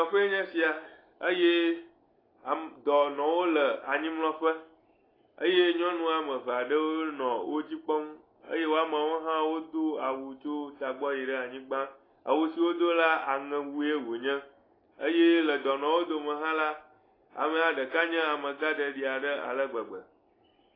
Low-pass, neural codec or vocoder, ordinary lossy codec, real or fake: 5.4 kHz; none; AAC, 24 kbps; real